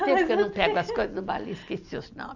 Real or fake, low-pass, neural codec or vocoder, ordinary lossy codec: real; 7.2 kHz; none; none